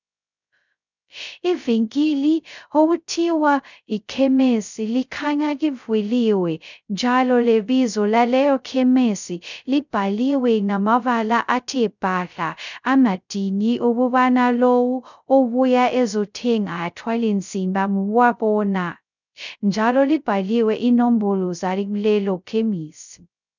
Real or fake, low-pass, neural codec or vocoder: fake; 7.2 kHz; codec, 16 kHz, 0.2 kbps, FocalCodec